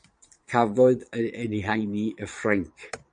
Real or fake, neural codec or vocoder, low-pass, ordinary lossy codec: fake; vocoder, 22.05 kHz, 80 mel bands, Vocos; 9.9 kHz; MP3, 96 kbps